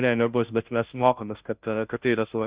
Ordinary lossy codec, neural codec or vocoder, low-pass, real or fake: Opus, 64 kbps; codec, 16 kHz, 0.5 kbps, FunCodec, trained on Chinese and English, 25 frames a second; 3.6 kHz; fake